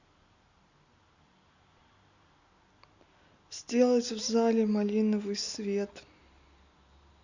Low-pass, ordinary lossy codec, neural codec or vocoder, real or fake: 7.2 kHz; Opus, 64 kbps; none; real